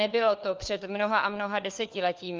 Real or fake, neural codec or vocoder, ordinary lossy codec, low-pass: fake; codec, 16 kHz, 4 kbps, FunCodec, trained on LibriTTS, 50 frames a second; Opus, 24 kbps; 7.2 kHz